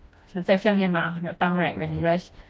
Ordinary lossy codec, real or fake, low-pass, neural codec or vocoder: none; fake; none; codec, 16 kHz, 1 kbps, FreqCodec, smaller model